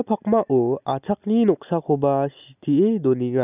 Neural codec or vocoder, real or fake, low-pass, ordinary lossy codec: none; real; 3.6 kHz; none